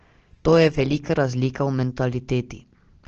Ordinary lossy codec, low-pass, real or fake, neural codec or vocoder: Opus, 16 kbps; 7.2 kHz; real; none